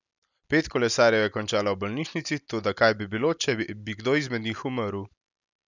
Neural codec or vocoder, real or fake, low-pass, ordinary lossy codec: none; real; 7.2 kHz; none